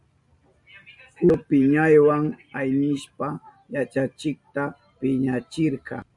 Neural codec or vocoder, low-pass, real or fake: none; 10.8 kHz; real